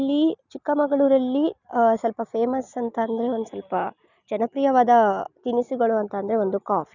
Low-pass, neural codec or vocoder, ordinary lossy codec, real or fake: 7.2 kHz; none; none; real